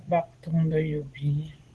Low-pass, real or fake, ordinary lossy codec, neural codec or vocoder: 10.8 kHz; fake; Opus, 16 kbps; vocoder, 24 kHz, 100 mel bands, Vocos